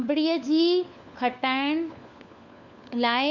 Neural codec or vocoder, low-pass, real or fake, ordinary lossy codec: codec, 16 kHz, 4 kbps, FunCodec, trained on LibriTTS, 50 frames a second; 7.2 kHz; fake; none